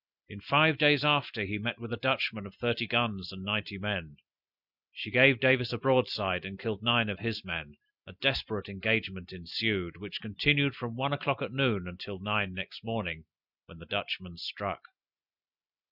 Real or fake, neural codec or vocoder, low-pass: real; none; 5.4 kHz